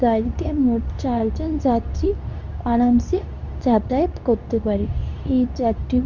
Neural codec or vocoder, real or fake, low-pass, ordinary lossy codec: codec, 24 kHz, 0.9 kbps, WavTokenizer, medium speech release version 2; fake; 7.2 kHz; none